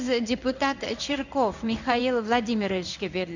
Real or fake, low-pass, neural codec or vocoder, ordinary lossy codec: fake; 7.2 kHz; codec, 16 kHz in and 24 kHz out, 1 kbps, XY-Tokenizer; none